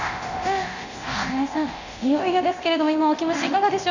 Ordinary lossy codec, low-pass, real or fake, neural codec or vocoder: none; 7.2 kHz; fake; codec, 24 kHz, 0.9 kbps, DualCodec